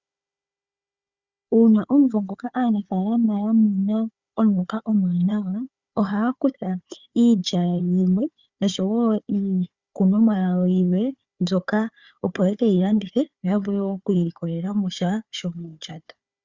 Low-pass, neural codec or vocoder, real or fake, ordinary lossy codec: 7.2 kHz; codec, 16 kHz, 4 kbps, FunCodec, trained on Chinese and English, 50 frames a second; fake; Opus, 64 kbps